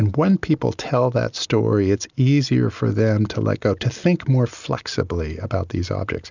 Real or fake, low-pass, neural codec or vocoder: real; 7.2 kHz; none